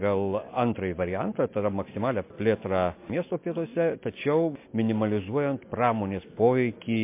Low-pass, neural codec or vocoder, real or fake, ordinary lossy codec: 3.6 kHz; none; real; MP3, 24 kbps